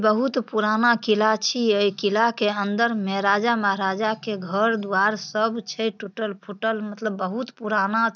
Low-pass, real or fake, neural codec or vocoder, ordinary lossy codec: 7.2 kHz; real; none; none